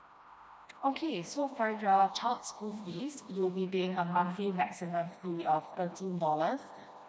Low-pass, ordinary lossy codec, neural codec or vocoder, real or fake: none; none; codec, 16 kHz, 1 kbps, FreqCodec, smaller model; fake